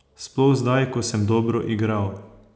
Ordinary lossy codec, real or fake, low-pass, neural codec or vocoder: none; real; none; none